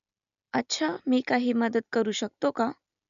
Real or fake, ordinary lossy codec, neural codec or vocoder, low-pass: real; none; none; 7.2 kHz